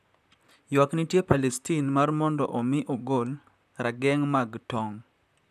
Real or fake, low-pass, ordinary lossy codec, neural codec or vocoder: fake; 14.4 kHz; none; vocoder, 44.1 kHz, 128 mel bands, Pupu-Vocoder